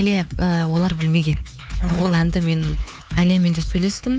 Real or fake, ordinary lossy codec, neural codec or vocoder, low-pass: fake; none; codec, 16 kHz, 4 kbps, X-Codec, HuBERT features, trained on LibriSpeech; none